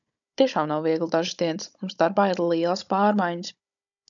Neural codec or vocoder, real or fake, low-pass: codec, 16 kHz, 16 kbps, FunCodec, trained on Chinese and English, 50 frames a second; fake; 7.2 kHz